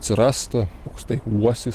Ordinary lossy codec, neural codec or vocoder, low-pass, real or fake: Opus, 16 kbps; none; 14.4 kHz; real